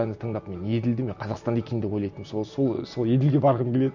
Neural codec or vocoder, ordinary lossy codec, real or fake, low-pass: none; none; real; 7.2 kHz